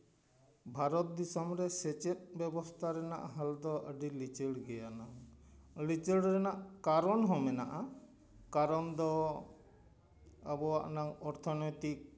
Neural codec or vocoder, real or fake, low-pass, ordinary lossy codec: none; real; none; none